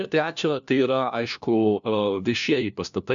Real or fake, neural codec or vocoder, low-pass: fake; codec, 16 kHz, 1 kbps, FunCodec, trained on LibriTTS, 50 frames a second; 7.2 kHz